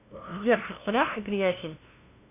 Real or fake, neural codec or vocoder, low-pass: fake; codec, 16 kHz, 0.5 kbps, FunCodec, trained on LibriTTS, 25 frames a second; 3.6 kHz